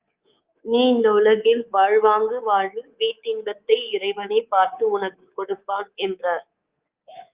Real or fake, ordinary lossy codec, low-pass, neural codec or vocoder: fake; Opus, 32 kbps; 3.6 kHz; codec, 24 kHz, 3.1 kbps, DualCodec